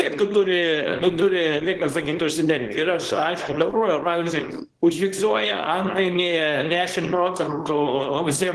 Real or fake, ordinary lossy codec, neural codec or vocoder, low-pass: fake; Opus, 16 kbps; codec, 24 kHz, 0.9 kbps, WavTokenizer, small release; 10.8 kHz